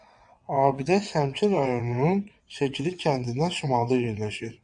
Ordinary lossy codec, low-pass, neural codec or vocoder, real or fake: MP3, 64 kbps; 9.9 kHz; vocoder, 22.05 kHz, 80 mel bands, WaveNeXt; fake